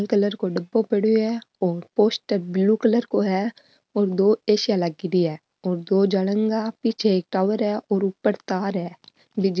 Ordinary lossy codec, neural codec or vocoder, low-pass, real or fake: none; none; none; real